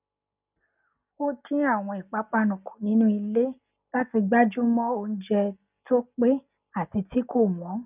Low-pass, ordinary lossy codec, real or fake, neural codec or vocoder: 3.6 kHz; none; real; none